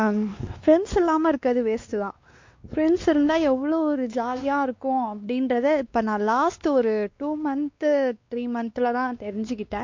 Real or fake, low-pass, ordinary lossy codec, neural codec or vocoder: fake; 7.2 kHz; MP3, 48 kbps; codec, 16 kHz, 2 kbps, X-Codec, WavLM features, trained on Multilingual LibriSpeech